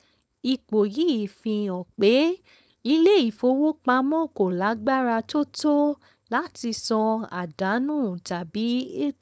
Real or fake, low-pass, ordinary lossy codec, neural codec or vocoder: fake; none; none; codec, 16 kHz, 4.8 kbps, FACodec